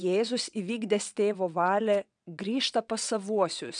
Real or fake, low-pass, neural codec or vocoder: fake; 9.9 kHz; vocoder, 22.05 kHz, 80 mel bands, WaveNeXt